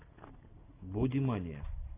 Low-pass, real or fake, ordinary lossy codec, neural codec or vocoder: 3.6 kHz; real; Opus, 64 kbps; none